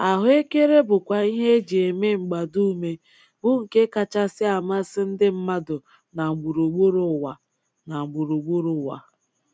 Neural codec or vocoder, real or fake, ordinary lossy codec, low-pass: none; real; none; none